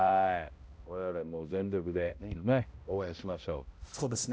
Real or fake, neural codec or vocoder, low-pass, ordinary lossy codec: fake; codec, 16 kHz, 0.5 kbps, X-Codec, HuBERT features, trained on balanced general audio; none; none